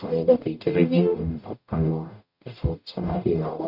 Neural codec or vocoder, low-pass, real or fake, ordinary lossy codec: codec, 44.1 kHz, 0.9 kbps, DAC; 5.4 kHz; fake; none